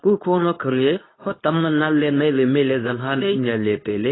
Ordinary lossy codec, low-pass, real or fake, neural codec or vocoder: AAC, 16 kbps; 7.2 kHz; fake; codec, 24 kHz, 0.9 kbps, WavTokenizer, medium speech release version 2